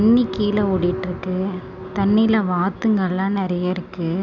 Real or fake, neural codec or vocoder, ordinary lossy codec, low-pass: real; none; none; 7.2 kHz